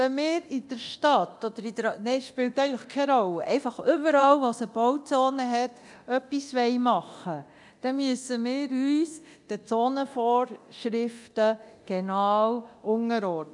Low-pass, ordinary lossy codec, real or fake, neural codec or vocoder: 10.8 kHz; none; fake; codec, 24 kHz, 0.9 kbps, DualCodec